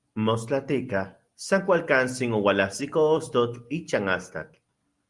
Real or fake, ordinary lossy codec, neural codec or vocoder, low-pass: real; Opus, 32 kbps; none; 10.8 kHz